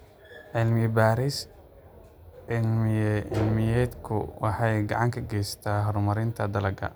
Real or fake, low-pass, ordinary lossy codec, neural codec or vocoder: real; none; none; none